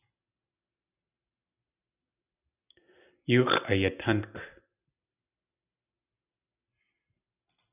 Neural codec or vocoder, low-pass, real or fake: none; 3.6 kHz; real